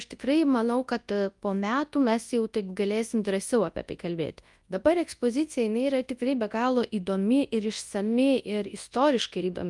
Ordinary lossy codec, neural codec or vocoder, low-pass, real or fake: Opus, 32 kbps; codec, 24 kHz, 0.9 kbps, WavTokenizer, large speech release; 10.8 kHz; fake